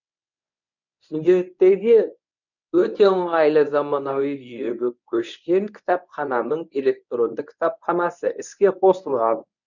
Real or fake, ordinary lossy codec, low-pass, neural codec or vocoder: fake; none; 7.2 kHz; codec, 24 kHz, 0.9 kbps, WavTokenizer, medium speech release version 1